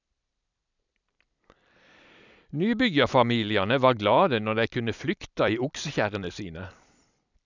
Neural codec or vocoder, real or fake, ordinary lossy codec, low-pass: vocoder, 44.1 kHz, 128 mel bands every 256 samples, BigVGAN v2; fake; none; 7.2 kHz